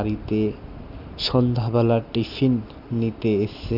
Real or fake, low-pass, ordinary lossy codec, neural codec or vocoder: real; 5.4 kHz; none; none